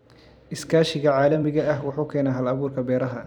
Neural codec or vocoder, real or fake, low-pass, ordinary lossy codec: vocoder, 44.1 kHz, 128 mel bands every 256 samples, BigVGAN v2; fake; 19.8 kHz; none